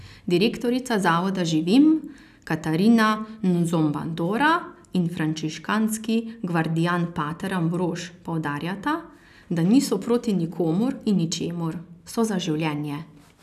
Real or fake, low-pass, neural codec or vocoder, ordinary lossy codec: real; 14.4 kHz; none; none